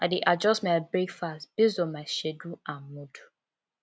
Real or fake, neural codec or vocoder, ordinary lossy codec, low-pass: real; none; none; none